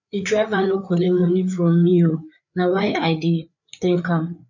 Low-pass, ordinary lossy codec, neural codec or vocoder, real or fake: 7.2 kHz; none; codec, 16 kHz, 4 kbps, FreqCodec, larger model; fake